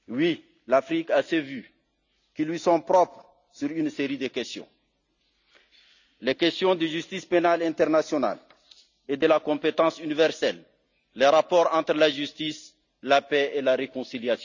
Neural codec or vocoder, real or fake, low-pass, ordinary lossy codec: none; real; 7.2 kHz; none